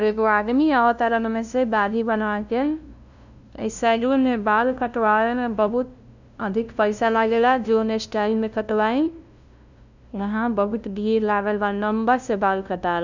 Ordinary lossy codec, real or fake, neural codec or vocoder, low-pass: none; fake; codec, 16 kHz, 0.5 kbps, FunCodec, trained on LibriTTS, 25 frames a second; 7.2 kHz